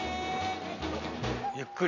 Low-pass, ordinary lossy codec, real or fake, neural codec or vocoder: 7.2 kHz; none; real; none